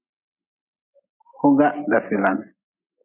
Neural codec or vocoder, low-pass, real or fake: none; 3.6 kHz; real